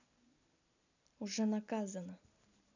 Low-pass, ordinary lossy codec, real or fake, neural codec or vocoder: 7.2 kHz; none; real; none